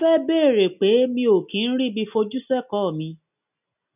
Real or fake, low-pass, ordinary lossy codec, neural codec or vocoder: real; 3.6 kHz; none; none